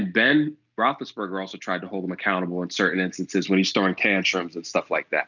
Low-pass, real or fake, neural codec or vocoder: 7.2 kHz; real; none